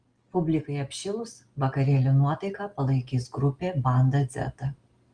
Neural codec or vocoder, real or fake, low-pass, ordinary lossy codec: none; real; 9.9 kHz; Opus, 24 kbps